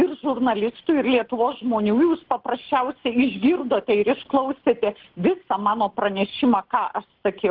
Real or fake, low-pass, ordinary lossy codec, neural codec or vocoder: real; 5.4 kHz; Opus, 16 kbps; none